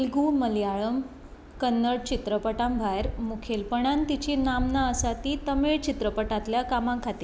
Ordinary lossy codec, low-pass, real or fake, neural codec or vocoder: none; none; real; none